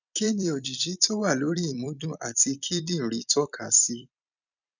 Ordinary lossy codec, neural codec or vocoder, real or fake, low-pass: none; vocoder, 44.1 kHz, 128 mel bands every 256 samples, BigVGAN v2; fake; 7.2 kHz